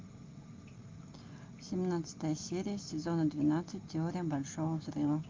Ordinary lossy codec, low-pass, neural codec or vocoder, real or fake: Opus, 24 kbps; 7.2 kHz; none; real